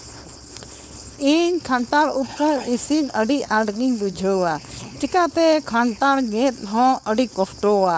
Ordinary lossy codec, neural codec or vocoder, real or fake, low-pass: none; codec, 16 kHz, 4.8 kbps, FACodec; fake; none